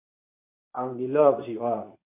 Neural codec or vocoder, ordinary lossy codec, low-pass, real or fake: codec, 24 kHz, 0.9 kbps, WavTokenizer, medium speech release version 2; MP3, 32 kbps; 3.6 kHz; fake